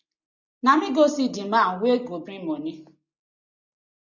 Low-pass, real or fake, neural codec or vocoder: 7.2 kHz; real; none